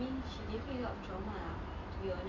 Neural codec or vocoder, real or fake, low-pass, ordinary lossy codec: none; real; 7.2 kHz; none